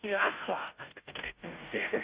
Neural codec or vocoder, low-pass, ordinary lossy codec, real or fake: codec, 16 kHz, 0.5 kbps, FunCodec, trained on Chinese and English, 25 frames a second; 3.6 kHz; Opus, 64 kbps; fake